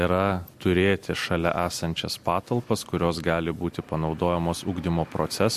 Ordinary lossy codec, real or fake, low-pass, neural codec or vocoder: MP3, 64 kbps; real; 14.4 kHz; none